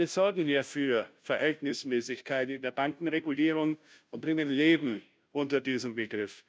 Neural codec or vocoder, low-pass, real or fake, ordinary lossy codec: codec, 16 kHz, 0.5 kbps, FunCodec, trained on Chinese and English, 25 frames a second; none; fake; none